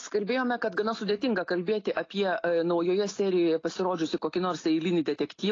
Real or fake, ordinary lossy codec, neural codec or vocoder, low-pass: real; AAC, 32 kbps; none; 7.2 kHz